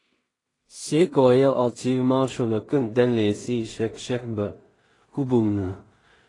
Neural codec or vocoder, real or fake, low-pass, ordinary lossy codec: codec, 16 kHz in and 24 kHz out, 0.4 kbps, LongCat-Audio-Codec, two codebook decoder; fake; 10.8 kHz; AAC, 32 kbps